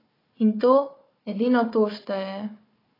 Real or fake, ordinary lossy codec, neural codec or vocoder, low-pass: fake; AAC, 24 kbps; codec, 16 kHz, 4 kbps, FunCodec, trained on Chinese and English, 50 frames a second; 5.4 kHz